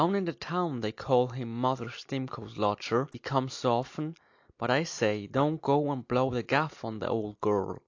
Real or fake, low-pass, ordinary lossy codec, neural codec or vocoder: real; 7.2 kHz; AAC, 48 kbps; none